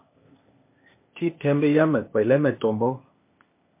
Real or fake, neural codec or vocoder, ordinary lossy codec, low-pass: fake; codec, 16 kHz, 0.8 kbps, ZipCodec; MP3, 24 kbps; 3.6 kHz